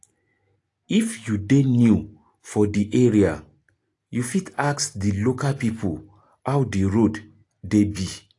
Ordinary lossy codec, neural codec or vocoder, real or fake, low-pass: AAC, 48 kbps; none; real; 10.8 kHz